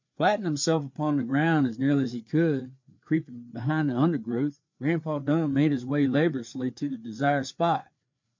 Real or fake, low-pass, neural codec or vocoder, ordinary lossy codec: fake; 7.2 kHz; codec, 16 kHz, 4 kbps, FreqCodec, larger model; MP3, 48 kbps